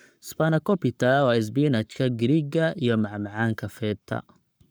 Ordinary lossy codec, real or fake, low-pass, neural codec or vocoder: none; fake; none; codec, 44.1 kHz, 7.8 kbps, Pupu-Codec